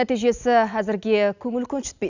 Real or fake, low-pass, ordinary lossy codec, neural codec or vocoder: real; 7.2 kHz; none; none